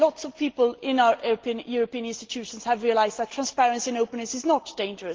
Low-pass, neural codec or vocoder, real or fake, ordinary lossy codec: 7.2 kHz; none; real; Opus, 16 kbps